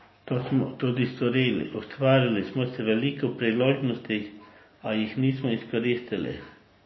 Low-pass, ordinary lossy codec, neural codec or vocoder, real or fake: 7.2 kHz; MP3, 24 kbps; none; real